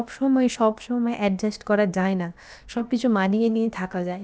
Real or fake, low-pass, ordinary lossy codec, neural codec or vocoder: fake; none; none; codec, 16 kHz, about 1 kbps, DyCAST, with the encoder's durations